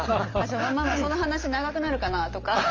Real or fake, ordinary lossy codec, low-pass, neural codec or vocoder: real; Opus, 24 kbps; 7.2 kHz; none